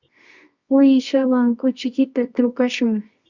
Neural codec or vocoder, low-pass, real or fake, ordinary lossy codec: codec, 24 kHz, 0.9 kbps, WavTokenizer, medium music audio release; 7.2 kHz; fake; Opus, 64 kbps